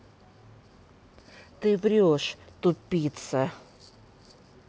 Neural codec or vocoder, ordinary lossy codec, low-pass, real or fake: none; none; none; real